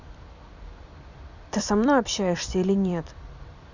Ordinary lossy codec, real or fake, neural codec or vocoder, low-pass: none; fake; vocoder, 44.1 kHz, 128 mel bands every 256 samples, BigVGAN v2; 7.2 kHz